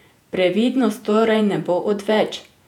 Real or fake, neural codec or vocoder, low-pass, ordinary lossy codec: fake; vocoder, 48 kHz, 128 mel bands, Vocos; 19.8 kHz; none